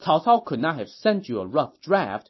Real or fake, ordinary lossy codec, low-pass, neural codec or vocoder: real; MP3, 24 kbps; 7.2 kHz; none